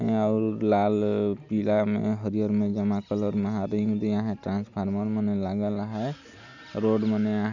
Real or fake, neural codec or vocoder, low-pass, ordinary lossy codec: real; none; 7.2 kHz; none